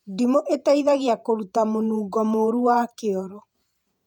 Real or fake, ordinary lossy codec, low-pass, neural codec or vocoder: fake; none; 19.8 kHz; vocoder, 48 kHz, 128 mel bands, Vocos